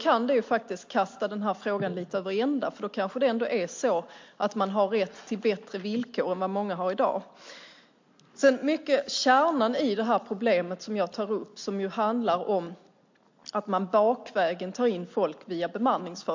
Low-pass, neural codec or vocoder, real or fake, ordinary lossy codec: 7.2 kHz; none; real; MP3, 48 kbps